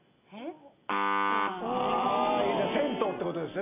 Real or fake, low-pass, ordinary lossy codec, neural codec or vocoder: real; 3.6 kHz; none; none